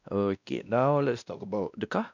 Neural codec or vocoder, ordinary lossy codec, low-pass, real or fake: codec, 16 kHz, 1 kbps, X-Codec, WavLM features, trained on Multilingual LibriSpeech; none; 7.2 kHz; fake